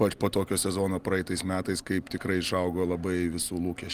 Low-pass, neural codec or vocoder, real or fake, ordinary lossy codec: 14.4 kHz; none; real; Opus, 32 kbps